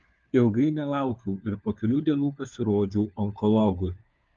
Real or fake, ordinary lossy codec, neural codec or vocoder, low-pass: fake; Opus, 24 kbps; codec, 16 kHz, 4 kbps, FunCodec, trained on LibriTTS, 50 frames a second; 7.2 kHz